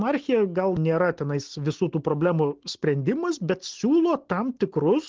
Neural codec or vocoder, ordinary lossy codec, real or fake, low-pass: none; Opus, 16 kbps; real; 7.2 kHz